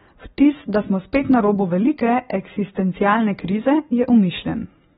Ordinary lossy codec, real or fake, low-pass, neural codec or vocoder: AAC, 16 kbps; real; 19.8 kHz; none